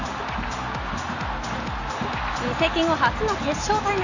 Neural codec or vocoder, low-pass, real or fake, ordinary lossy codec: none; 7.2 kHz; real; none